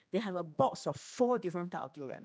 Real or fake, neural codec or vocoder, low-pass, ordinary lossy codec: fake; codec, 16 kHz, 2 kbps, X-Codec, HuBERT features, trained on general audio; none; none